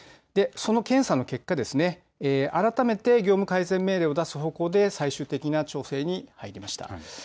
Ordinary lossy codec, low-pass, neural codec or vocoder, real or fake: none; none; none; real